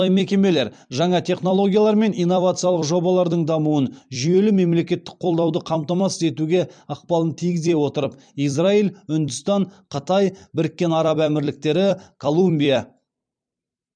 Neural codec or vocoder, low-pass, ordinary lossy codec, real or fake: vocoder, 44.1 kHz, 128 mel bands every 256 samples, BigVGAN v2; 9.9 kHz; MP3, 96 kbps; fake